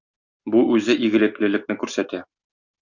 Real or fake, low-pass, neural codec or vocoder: real; 7.2 kHz; none